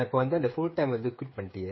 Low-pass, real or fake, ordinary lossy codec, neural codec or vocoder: 7.2 kHz; fake; MP3, 24 kbps; codec, 16 kHz, 8 kbps, FreqCodec, smaller model